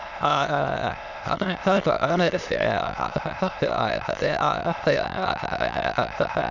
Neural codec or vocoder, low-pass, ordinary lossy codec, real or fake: autoencoder, 22.05 kHz, a latent of 192 numbers a frame, VITS, trained on many speakers; 7.2 kHz; none; fake